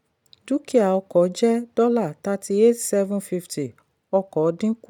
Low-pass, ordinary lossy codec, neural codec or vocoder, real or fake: 19.8 kHz; none; none; real